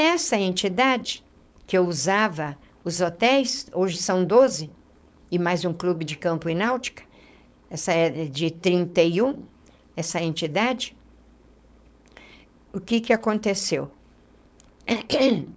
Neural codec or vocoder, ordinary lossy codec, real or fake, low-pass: codec, 16 kHz, 4.8 kbps, FACodec; none; fake; none